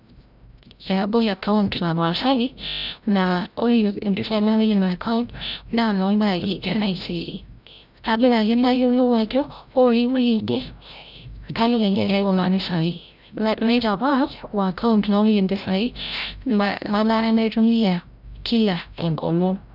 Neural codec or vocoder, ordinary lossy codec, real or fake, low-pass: codec, 16 kHz, 0.5 kbps, FreqCodec, larger model; none; fake; 5.4 kHz